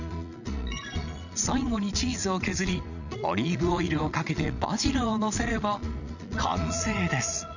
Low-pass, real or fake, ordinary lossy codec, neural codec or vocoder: 7.2 kHz; fake; none; vocoder, 22.05 kHz, 80 mel bands, Vocos